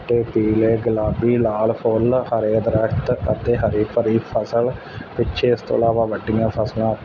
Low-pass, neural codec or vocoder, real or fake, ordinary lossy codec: 7.2 kHz; none; real; none